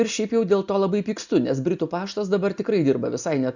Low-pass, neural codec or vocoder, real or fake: 7.2 kHz; none; real